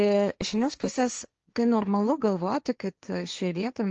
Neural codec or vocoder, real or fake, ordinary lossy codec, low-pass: codec, 16 kHz, 1.1 kbps, Voila-Tokenizer; fake; Opus, 24 kbps; 7.2 kHz